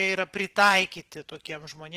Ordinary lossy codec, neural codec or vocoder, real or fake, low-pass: Opus, 16 kbps; vocoder, 44.1 kHz, 128 mel bands every 512 samples, BigVGAN v2; fake; 14.4 kHz